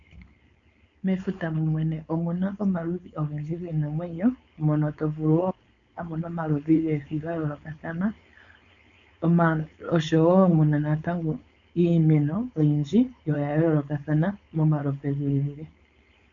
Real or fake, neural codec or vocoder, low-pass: fake; codec, 16 kHz, 4.8 kbps, FACodec; 7.2 kHz